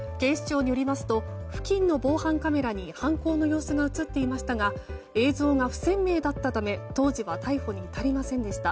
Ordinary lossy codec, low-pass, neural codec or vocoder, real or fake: none; none; none; real